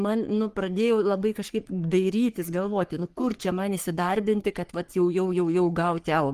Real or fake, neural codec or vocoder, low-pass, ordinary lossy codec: fake; codec, 44.1 kHz, 3.4 kbps, Pupu-Codec; 14.4 kHz; Opus, 24 kbps